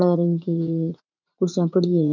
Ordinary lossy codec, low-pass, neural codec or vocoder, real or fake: none; 7.2 kHz; vocoder, 44.1 kHz, 80 mel bands, Vocos; fake